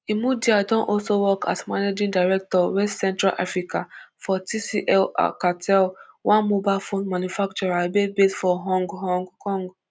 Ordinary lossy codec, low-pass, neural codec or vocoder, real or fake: none; none; none; real